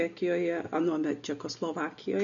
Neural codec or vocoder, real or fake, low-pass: none; real; 7.2 kHz